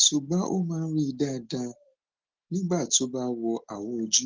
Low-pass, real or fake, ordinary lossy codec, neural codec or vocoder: 7.2 kHz; real; Opus, 16 kbps; none